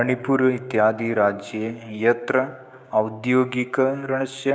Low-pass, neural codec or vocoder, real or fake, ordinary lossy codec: none; codec, 16 kHz, 6 kbps, DAC; fake; none